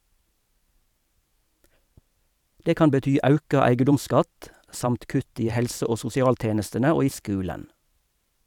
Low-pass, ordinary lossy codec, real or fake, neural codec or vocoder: 19.8 kHz; none; fake; vocoder, 44.1 kHz, 128 mel bands every 512 samples, BigVGAN v2